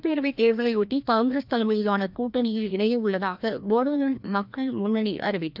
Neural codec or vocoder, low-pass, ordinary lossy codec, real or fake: codec, 16 kHz, 1 kbps, FreqCodec, larger model; 5.4 kHz; none; fake